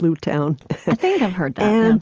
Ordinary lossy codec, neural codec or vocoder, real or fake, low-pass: Opus, 24 kbps; none; real; 7.2 kHz